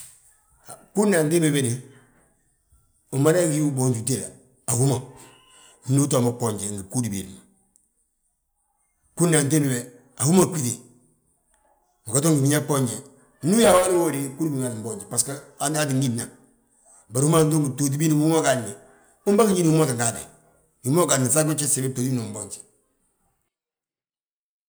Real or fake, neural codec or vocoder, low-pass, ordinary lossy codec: real; none; none; none